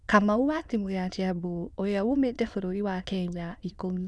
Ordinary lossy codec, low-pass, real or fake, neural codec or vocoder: none; none; fake; autoencoder, 22.05 kHz, a latent of 192 numbers a frame, VITS, trained on many speakers